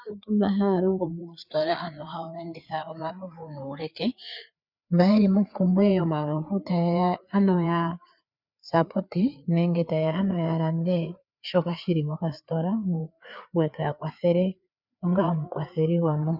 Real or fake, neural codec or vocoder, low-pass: fake; codec, 16 kHz, 4 kbps, FreqCodec, larger model; 5.4 kHz